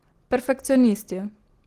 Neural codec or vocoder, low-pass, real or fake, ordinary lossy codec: none; 14.4 kHz; real; Opus, 16 kbps